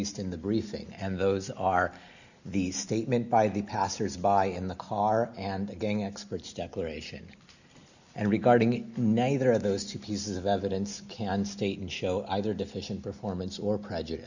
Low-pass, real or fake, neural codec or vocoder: 7.2 kHz; real; none